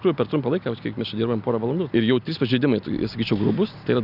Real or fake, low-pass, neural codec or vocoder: real; 5.4 kHz; none